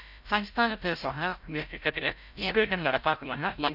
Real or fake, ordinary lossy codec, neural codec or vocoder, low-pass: fake; none; codec, 16 kHz, 0.5 kbps, FreqCodec, larger model; 5.4 kHz